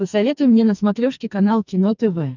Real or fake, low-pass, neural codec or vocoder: fake; 7.2 kHz; codec, 44.1 kHz, 2.6 kbps, SNAC